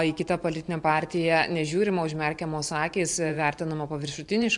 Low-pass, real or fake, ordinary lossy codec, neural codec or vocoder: 10.8 kHz; fake; AAC, 64 kbps; vocoder, 44.1 kHz, 128 mel bands every 512 samples, BigVGAN v2